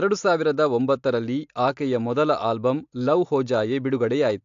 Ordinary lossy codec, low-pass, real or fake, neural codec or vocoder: AAC, 64 kbps; 7.2 kHz; real; none